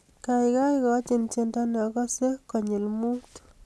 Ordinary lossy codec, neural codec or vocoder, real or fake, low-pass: none; none; real; none